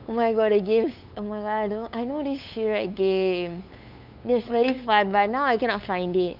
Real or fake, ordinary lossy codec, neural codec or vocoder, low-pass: fake; none; codec, 16 kHz, 8 kbps, FunCodec, trained on LibriTTS, 25 frames a second; 5.4 kHz